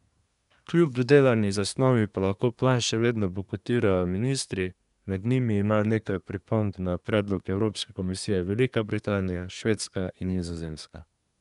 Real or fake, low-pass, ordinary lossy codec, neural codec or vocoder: fake; 10.8 kHz; none; codec, 24 kHz, 1 kbps, SNAC